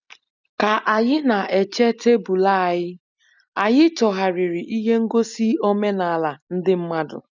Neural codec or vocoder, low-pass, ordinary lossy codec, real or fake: none; 7.2 kHz; none; real